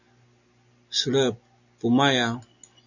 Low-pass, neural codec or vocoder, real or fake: 7.2 kHz; none; real